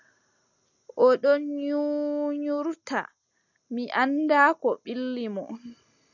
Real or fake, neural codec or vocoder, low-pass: real; none; 7.2 kHz